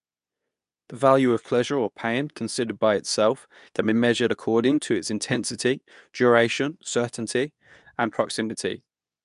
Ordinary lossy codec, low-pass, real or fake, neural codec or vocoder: none; 10.8 kHz; fake; codec, 24 kHz, 0.9 kbps, WavTokenizer, medium speech release version 2